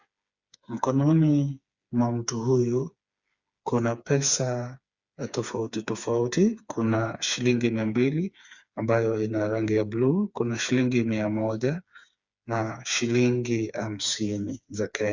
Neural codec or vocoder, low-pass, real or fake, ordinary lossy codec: codec, 16 kHz, 4 kbps, FreqCodec, smaller model; 7.2 kHz; fake; Opus, 64 kbps